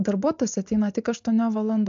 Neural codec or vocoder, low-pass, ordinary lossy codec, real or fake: none; 7.2 kHz; MP3, 64 kbps; real